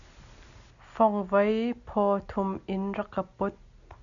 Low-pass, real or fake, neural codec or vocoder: 7.2 kHz; real; none